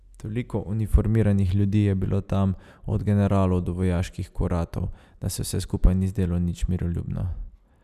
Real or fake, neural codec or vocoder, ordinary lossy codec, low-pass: real; none; none; 14.4 kHz